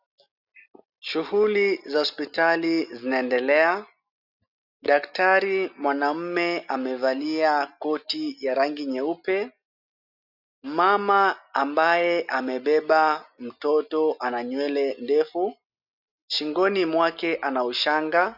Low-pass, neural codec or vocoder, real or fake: 5.4 kHz; none; real